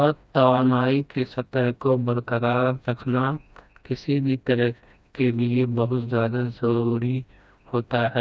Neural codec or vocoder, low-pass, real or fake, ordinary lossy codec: codec, 16 kHz, 1 kbps, FreqCodec, smaller model; none; fake; none